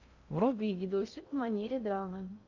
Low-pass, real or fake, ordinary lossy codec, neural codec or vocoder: 7.2 kHz; fake; MP3, 64 kbps; codec, 16 kHz in and 24 kHz out, 0.6 kbps, FocalCodec, streaming, 2048 codes